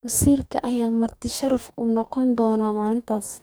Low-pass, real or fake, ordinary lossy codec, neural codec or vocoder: none; fake; none; codec, 44.1 kHz, 2.6 kbps, DAC